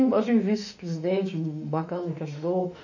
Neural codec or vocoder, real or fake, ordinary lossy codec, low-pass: autoencoder, 48 kHz, 32 numbers a frame, DAC-VAE, trained on Japanese speech; fake; none; 7.2 kHz